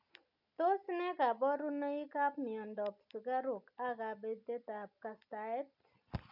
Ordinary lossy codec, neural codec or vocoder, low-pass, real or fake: none; none; 5.4 kHz; real